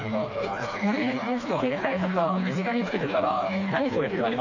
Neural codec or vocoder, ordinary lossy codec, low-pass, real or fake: codec, 16 kHz, 2 kbps, FreqCodec, smaller model; none; 7.2 kHz; fake